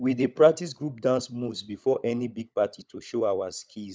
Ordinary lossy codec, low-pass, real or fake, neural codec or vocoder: none; none; fake; codec, 16 kHz, 16 kbps, FunCodec, trained on LibriTTS, 50 frames a second